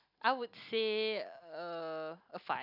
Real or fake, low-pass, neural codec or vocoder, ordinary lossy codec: real; 5.4 kHz; none; none